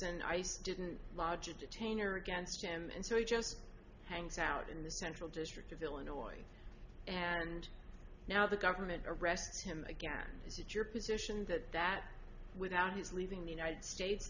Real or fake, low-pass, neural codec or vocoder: real; 7.2 kHz; none